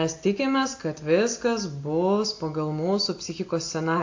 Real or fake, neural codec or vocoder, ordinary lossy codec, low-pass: real; none; AAC, 48 kbps; 7.2 kHz